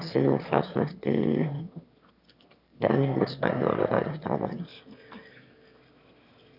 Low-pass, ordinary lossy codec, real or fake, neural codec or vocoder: 5.4 kHz; none; fake; autoencoder, 22.05 kHz, a latent of 192 numbers a frame, VITS, trained on one speaker